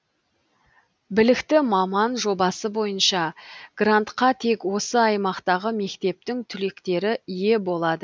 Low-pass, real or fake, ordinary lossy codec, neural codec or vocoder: none; real; none; none